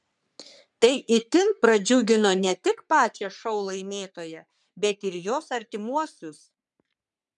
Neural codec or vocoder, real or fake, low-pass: codec, 44.1 kHz, 3.4 kbps, Pupu-Codec; fake; 10.8 kHz